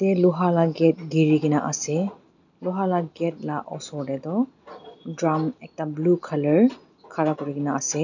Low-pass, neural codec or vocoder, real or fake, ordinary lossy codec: 7.2 kHz; none; real; none